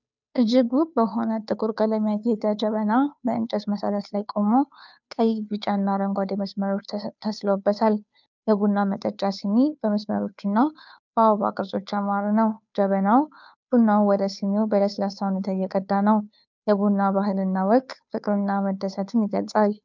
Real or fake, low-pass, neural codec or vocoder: fake; 7.2 kHz; codec, 16 kHz, 2 kbps, FunCodec, trained on Chinese and English, 25 frames a second